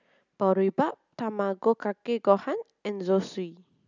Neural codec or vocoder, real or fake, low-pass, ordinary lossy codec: none; real; 7.2 kHz; none